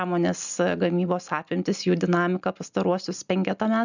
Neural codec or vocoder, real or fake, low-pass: none; real; 7.2 kHz